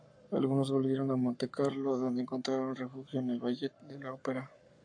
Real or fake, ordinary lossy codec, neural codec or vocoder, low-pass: fake; AAC, 64 kbps; codec, 44.1 kHz, 7.8 kbps, Pupu-Codec; 9.9 kHz